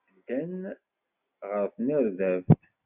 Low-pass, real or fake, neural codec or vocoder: 3.6 kHz; real; none